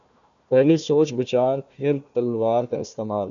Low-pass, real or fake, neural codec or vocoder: 7.2 kHz; fake; codec, 16 kHz, 1 kbps, FunCodec, trained on Chinese and English, 50 frames a second